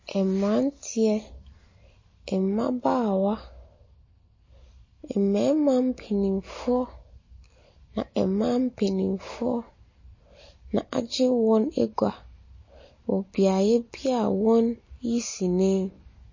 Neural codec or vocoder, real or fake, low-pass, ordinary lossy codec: none; real; 7.2 kHz; MP3, 32 kbps